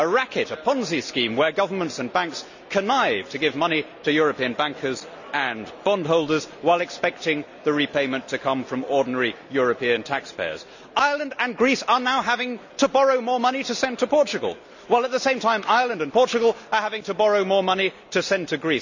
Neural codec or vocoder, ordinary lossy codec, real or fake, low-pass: none; none; real; 7.2 kHz